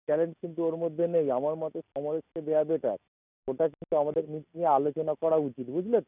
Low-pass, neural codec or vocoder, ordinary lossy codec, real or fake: 3.6 kHz; none; none; real